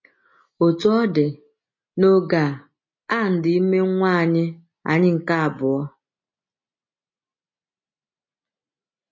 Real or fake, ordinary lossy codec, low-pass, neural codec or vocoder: real; MP3, 32 kbps; 7.2 kHz; none